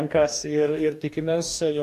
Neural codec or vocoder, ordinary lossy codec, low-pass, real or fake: codec, 44.1 kHz, 2.6 kbps, DAC; AAC, 64 kbps; 14.4 kHz; fake